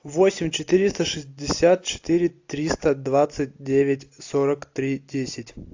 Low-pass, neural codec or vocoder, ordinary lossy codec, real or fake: 7.2 kHz; none; AAC, 48 kbps; real